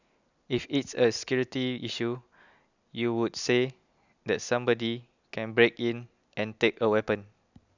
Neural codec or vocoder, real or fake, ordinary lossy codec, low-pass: none; real; none; 7.2 kHz